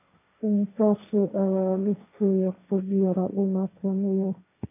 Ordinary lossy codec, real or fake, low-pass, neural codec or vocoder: AAC, 24 kbps; fake; 3.6 kHz; codec, 16 kHz, 1.1 kbps, Voila-Tokenizer